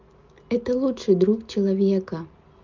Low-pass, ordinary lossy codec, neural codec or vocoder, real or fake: 7.2 kHz; Opus, 32 kbps; none; real